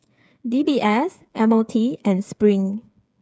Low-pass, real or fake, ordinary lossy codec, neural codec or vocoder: none; fake; none; codec, 16 kHz, 8 kbps, FreqCodec, smaller model